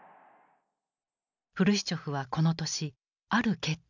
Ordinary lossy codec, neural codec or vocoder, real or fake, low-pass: none; none; real; 7.2 kHz